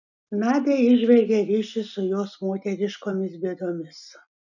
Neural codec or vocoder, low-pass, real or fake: none; 7.2 kHz; real